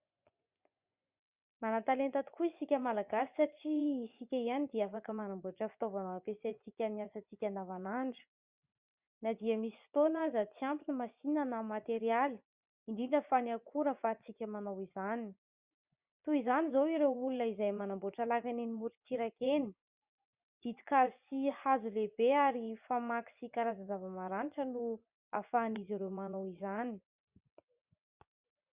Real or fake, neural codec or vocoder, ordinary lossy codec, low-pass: fake; vocoder, 24 kHz, 100 mel bands, Vocos; Opus, 64 kbps; 3.6 kHz